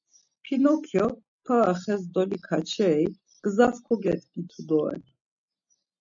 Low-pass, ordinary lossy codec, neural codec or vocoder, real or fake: 7.2 kHz; MP3, 64 kbps; none; real